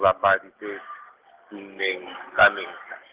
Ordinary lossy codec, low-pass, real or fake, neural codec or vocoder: Opus, 16 kbps; 3.6 kHz; real; none